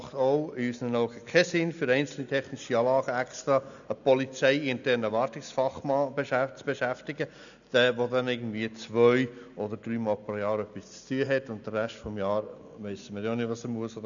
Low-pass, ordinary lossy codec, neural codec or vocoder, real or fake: 7.2 kHz; none; none; real